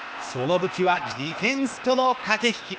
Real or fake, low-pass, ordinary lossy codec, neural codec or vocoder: fake; none; none; codec, 16 kHz, 0.8 kbps, ZipCodec